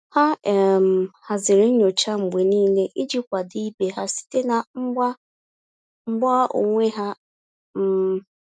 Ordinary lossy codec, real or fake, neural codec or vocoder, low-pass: none; real; none; none